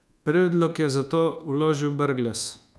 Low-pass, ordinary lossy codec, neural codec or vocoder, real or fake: none; none; codec, 24 kHz, 1.2 kbps, DualCodec; fake